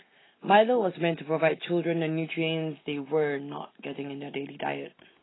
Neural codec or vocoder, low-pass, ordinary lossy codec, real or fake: none; 7.2 kHz; AAC, 16 kbps; real